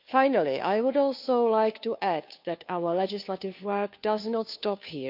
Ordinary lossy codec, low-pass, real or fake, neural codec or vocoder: none; 5.4 kHz; fake; codec, 16 kHz, 2 kbps, FunCodec, trained on Chinese and English, 25 frames a second